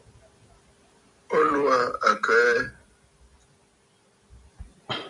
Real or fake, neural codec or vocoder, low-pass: real; none; 10.8 kHz